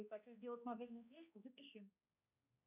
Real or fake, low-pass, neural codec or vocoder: fake; 3.6 kHz; codec, 16 kHz, 1 kbps, X-Codec, HuBERT features, trained on balanced general audio